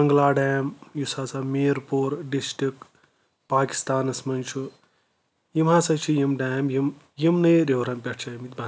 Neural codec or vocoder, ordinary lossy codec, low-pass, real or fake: none; none; none; real